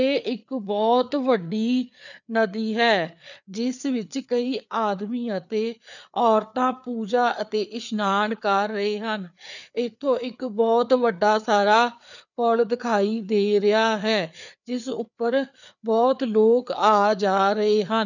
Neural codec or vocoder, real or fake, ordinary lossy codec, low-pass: codec, 16 kHz, 4 kbps, FreqCodec, larger model; fake; none; 7.2 kHz